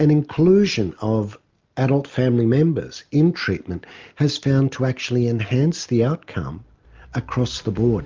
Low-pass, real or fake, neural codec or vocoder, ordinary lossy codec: 7.2 kHz; real; none; Opus, 24 kbps